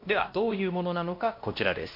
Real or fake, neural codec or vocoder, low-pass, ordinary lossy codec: fake; codec, 16 kHz, 1 kbps, X-Codec, WavLM features, trained on Multilingual LibriSpeech; 5.4 kHz; MP3, 32 kbps